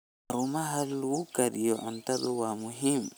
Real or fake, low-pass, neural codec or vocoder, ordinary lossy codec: real; none; none; none